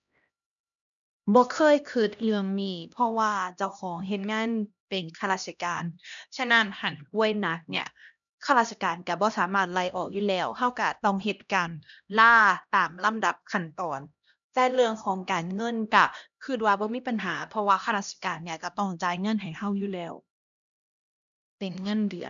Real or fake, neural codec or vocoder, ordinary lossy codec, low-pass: fake; codec, 16 kHz, 1 kbps, X-Codec, HuBERT features, trained on LibriSpeech; none; 7.2 kHz